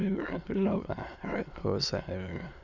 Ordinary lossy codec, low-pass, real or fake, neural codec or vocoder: none; 7.2 kHz; fake; autoencoder, 22.05 kHz, a latent of 192 numbers a frame, VITS, trained on many speakers